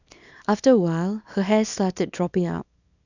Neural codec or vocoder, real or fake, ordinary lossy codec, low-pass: codec, 24 kHz, 0.9 kbps, WavTokenizer, small release; fake; none; 7.2 kHz